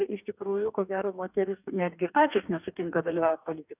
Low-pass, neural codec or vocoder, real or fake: 3.6 kHz; codec, 44.1 kHz, 2.6 kbps, DAC; fake